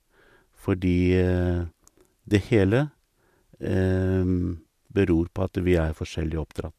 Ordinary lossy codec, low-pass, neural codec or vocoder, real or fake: MP3, 96 kbps; 14.4 kHz; none; real